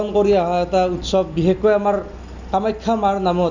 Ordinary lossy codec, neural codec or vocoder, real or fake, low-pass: none; none; real; 7.2 kHz